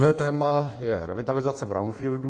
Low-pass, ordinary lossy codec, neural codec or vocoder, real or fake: 9.9 kHz; AAC, 48 kbps; codec, 16 kHz in and 24 kHz out, 1.1 kbps, FireRedTTS-2 codec; fake